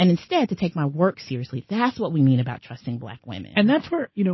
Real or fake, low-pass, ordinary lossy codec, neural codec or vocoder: real; 7.2 kHz; MP3, 24 kbps; none